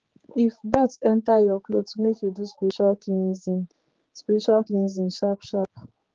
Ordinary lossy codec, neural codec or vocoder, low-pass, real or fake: Opus, 16 kbps; codec, 16 kHz, 4 kbps, X-Codec, HuBERT features, trained on general audio; 7.2 kHz; fake